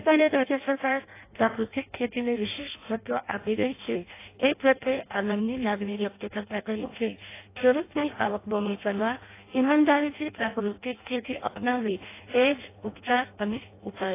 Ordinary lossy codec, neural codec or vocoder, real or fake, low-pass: AAC, 24 kbps; codec, 16 kHz in and 24 kHz out, 0.6 kbps, FireRedTTS-2 codec; fake; 3.6 kHz